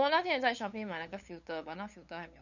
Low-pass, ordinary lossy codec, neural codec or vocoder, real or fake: 7.2 kHz; none; codec, 16 kHz, 8 kbps, FunCodec, trained on LibriTTS, 25 frames a second; fake